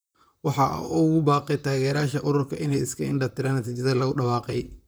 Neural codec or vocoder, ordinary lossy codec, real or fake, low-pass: vocoder, 44.1 kHz, 128 mel bands, Pupu-Vocoder; none; fake; none